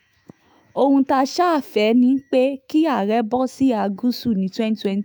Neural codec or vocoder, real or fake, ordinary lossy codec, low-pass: autoencoder, 48 kHz, 128 numbers a frame, DAC-VAE, trained on Japanese speech; fake; none; none